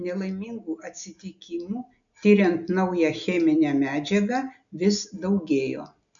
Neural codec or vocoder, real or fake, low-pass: none; real; 7.2 kHz